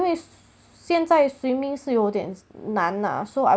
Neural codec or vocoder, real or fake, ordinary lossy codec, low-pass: none; real; none; none